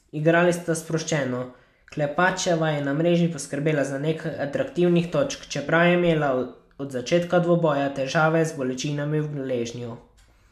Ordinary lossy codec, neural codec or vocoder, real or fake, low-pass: MP3, 96 kbps; none; real; 14.4 kHz